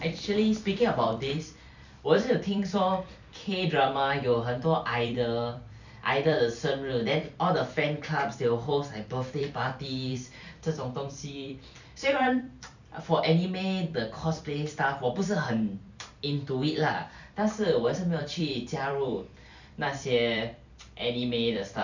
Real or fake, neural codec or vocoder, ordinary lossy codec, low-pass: fake; vocoder, 44.1 kHz, 128 mel bands every 256 samples, BigVGAN v2; none; 7.2 kHz